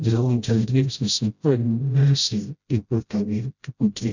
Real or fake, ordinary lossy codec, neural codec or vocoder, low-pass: fake; none; codec, 16 kHz, 0.5 kbps, FreqCodec, smaller model; 7.2 kHz